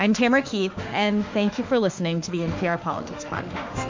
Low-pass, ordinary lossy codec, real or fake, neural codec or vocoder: 7.2 kHz; MP3, 48 kbps; fake; autoencoder, 48 kHz, 32 numbers a frame, DAC-VAE, trained on Japanese speech